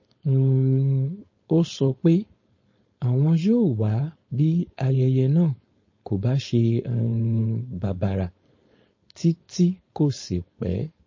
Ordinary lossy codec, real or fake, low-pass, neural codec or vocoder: MP3, 32 kbps; fake; 7.2 kHz; codec, 16 kHz, 4.8 kbps, FACodec